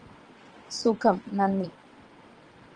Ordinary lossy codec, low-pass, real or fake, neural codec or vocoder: Opus, 24 kbps; 9.9 kHz; fake; vocoder, 22.05 kHz, 80 mel bands, WaveNeXt